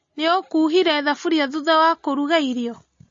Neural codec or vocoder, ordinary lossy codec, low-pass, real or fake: none; MP3, 32 kbps; 7.2 kHz; real